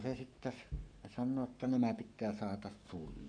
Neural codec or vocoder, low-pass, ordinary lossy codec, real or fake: codec, 44.1 kHz, 7.8 kbps, Pupu-Codec; 9.9 kHz; none; fake